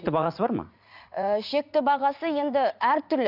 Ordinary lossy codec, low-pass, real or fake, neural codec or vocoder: none; 5.4 kHz; fake; vocoder, 44.1 kHz, 80 mel bands, Vocos